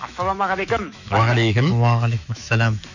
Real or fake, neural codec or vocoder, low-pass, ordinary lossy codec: fake; autoencoder, 48 kHz, 128 numbers a frame, DAC-VAE, trained on Japanese speech; 7.2 kHz; none